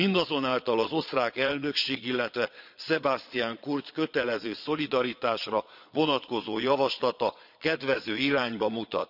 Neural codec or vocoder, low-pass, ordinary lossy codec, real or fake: vocoder, 22.05 kHz, 80 mel bands, Vocos; 5.4 kHz; none; fake